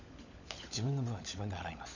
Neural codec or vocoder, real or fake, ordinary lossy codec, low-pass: codec, 16 kHz, 16 kbps, FunCodec, trained on LibriTTS, 50 frames a second; fake; none; 7.2 kHz